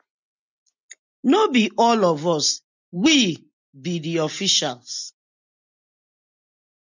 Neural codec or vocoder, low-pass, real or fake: none; 7.2 kHz; real